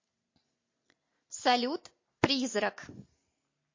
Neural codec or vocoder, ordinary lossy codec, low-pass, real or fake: none; MP3, 32 kbps; 7.2 kHz; real